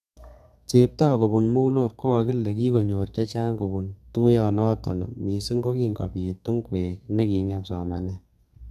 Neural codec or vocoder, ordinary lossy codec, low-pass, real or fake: codec, 32 kHz, 1.9 kbps, SNAC; none; 14.4 kHz; fake